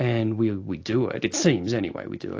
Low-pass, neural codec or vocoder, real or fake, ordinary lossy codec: 7.2 kHz; none; real; AAC, 48 kbps